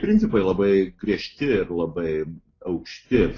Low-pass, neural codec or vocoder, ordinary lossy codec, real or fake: 7.2 kHz; none; AAC, 32 kbps; real